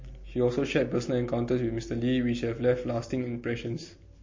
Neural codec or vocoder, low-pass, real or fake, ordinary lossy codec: none; 7.2 kHz; real; MP3, 32 kbps